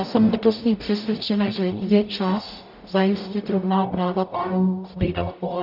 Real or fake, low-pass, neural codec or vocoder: fake; 5.4 kHz; codec, 44.1 kHz, 0.9 kbps, DAC